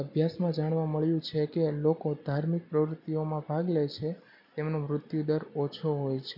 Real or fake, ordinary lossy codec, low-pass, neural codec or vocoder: real; none; 5.4 kHz; none